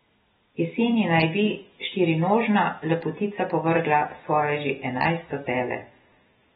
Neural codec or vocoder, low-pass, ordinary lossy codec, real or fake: none; 19.8 kHz; AAC, 16 kbps; real